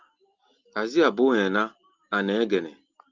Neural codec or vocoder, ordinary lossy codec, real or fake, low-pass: none; Opus, 32 kbps; real; 7.2 kHz